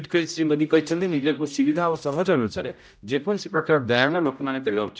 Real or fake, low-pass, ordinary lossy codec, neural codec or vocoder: fake; none; none; codec, 16 kHz, 0.5 kbps, X-Codec, HuBERT features, trained on general audio